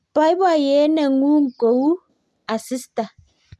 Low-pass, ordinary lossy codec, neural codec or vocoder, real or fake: none; none; none; real